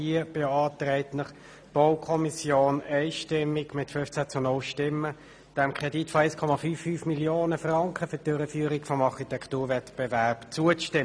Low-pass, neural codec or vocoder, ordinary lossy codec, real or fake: none; none; none; real